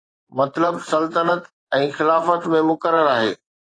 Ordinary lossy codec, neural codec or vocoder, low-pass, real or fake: AAC, 32 kbps; vocoder, 24 kHz, 100 mel bands, Vocos; 9.9 kHz; fake